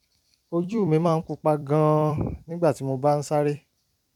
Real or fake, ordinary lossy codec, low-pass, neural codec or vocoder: fake; none; 19.8 kHz; vocoder, 44.1 kHz, 128 mel bands every 256 samples, BigVGAN v2